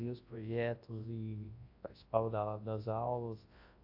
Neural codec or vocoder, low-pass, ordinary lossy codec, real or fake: codec, 24 kHz, 0.9 kbps, WavTokenizer, large speech release; 5.4 kHz; AAC, 32 kbps; fake